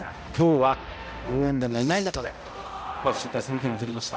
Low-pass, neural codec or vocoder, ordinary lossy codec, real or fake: none; codec, 16 kHz, 0.5 kbps, X-Codec, HuBERT features, trained on balanced general audio; none; fake